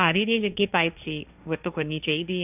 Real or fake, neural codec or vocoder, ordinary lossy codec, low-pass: fake; codec, 16 kHz, 1.1 kbps, Voila-Tokenizer; none; 3.6 kHz